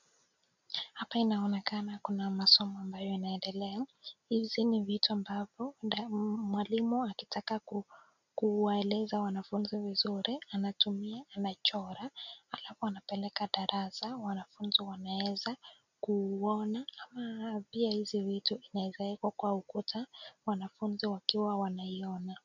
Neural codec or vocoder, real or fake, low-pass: none; real; 7.2 kHz